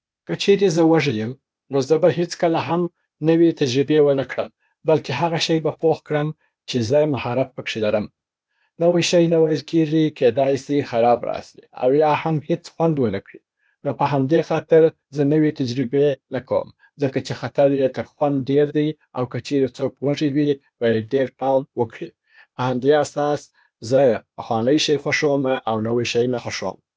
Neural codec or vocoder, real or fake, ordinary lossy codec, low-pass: codec, 16 kHz, 0.8 kbps, ZipCodec; fake; none; none